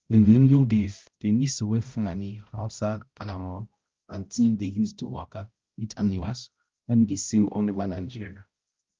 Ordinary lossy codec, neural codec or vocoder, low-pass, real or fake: Opus, 32 kbps; codec, 16 kHz, 0.5 kbps, X-Codec, HuBERT features, trained on balanced general audio; 7.2 kHz; fake